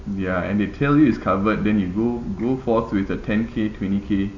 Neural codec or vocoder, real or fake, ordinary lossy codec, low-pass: none; real; none; 7.2 kHz